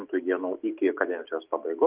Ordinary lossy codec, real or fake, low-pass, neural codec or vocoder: Opus, 24 kbps; real; 3.6 kHz; none